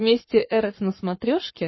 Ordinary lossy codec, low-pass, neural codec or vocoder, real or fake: MP3, 24 kbps; 7.2 kHz; codec, 44.1 kHz, 7.8 kbps, Pupu-Codec; fake